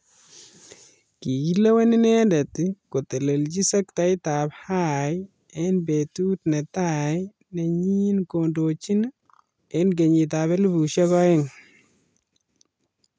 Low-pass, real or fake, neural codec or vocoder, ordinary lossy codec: none; real; none; none